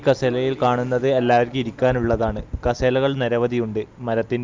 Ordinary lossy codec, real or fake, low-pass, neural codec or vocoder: Opus, 24 kbps; real; 7.2 kHz; none